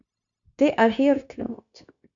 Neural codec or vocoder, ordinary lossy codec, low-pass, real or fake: codec, 16 kHz, 0.9 kbps, LongCat-Audio-Codec; AAC, 64 kbps; 7.2 kHz; fake